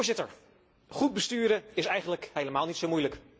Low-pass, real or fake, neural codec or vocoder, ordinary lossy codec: none; real; none; none